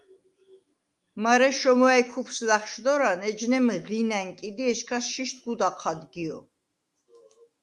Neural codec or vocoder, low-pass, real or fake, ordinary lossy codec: autoencoder, 48 kHz, 128 numbers a frame, DAC-VAE, trained on Japanese speech; 10.8 kHz; fake; Opus, 32 kbps